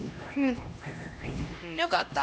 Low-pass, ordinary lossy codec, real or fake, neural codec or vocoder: none; none; fake; codec, 16 kHz, 1 kbps, X-Codec, HuBERT features, trained on LibriSpeech